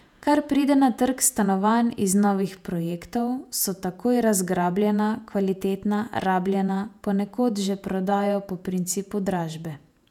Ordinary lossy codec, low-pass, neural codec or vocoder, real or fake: none; 19.8 kHz; vocoder, 48 kHz, 128 mel bands, Vocos; fake